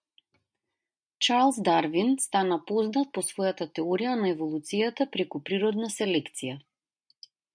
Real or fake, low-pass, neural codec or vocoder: real; 9.9 kHz; none